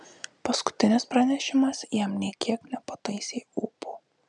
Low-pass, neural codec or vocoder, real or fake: 10.8 kHz; none; real